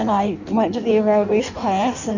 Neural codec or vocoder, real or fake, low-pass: codec, 16 kHz in and 24 kHz out, 1.1 kbps, FireRedTTS-2 codec; fake; 7.2 kHz